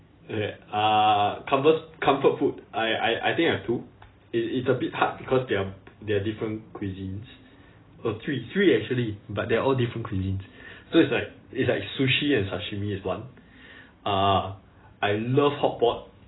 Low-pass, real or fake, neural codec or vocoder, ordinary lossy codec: 7.2 kHz; real; none; AAC, 16 kbps